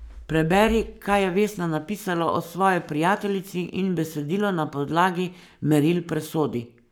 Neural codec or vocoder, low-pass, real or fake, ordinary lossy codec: codec, 44.1 kHz, 7.8 kbps, Pupu-Codec; none; fake; none